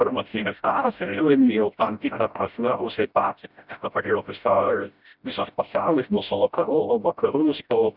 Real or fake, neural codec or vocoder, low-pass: fake; codec, 16 kHz, 0.5 kbps, FreqCodec, smaller model; 5.4 kHz